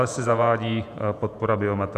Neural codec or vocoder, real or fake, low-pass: vocoder, 48 kHz, 128 mel bands, Vocos; fake; 14.4 kHz